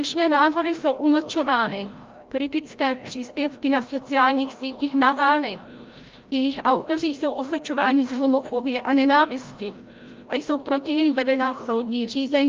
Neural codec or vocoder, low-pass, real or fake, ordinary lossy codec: codec, 16 kHz, 0.5 kbps, FreqCodec, larger model; 7.2 kHz; fake; Opus, 24 kbps